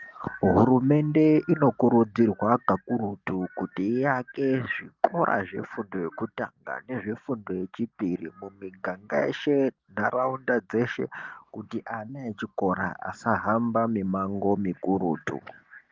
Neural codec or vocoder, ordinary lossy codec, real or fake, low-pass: none; Opus, 32 kbps; real; 7.2 kHz